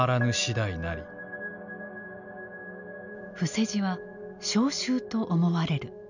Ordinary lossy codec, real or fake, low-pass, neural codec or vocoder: none; real; 7.2 kHz; none